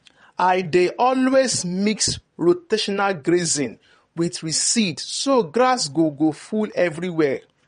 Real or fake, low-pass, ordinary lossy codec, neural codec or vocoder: fake; 9.9 kHz; MP3, 48 kbps; vocoder, 22.05 kHz, 80 mel bands, WaveNeXt